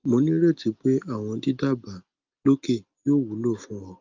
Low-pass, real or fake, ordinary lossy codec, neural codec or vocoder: 7.2 kHz; real; Opus, 24 kbps; none